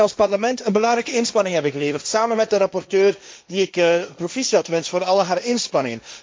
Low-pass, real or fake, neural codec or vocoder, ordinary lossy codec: none; fake; codec, 16 kHz, 1.1 kbps, Voila-Tokenizer; none